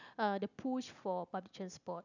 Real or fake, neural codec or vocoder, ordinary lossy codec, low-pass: real; none; none; 7.2 kHz